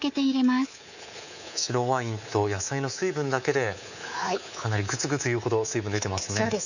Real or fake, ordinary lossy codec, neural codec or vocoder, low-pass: fake; none; codec, 24 kHz, 3.1 kbps, DualCodec; 7.2 kHz